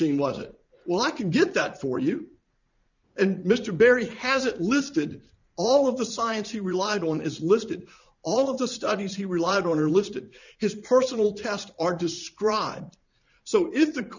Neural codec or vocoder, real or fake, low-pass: vocoder, 44.1 kHz, 80 mel bands, Vocos; fake; 7.2 kHz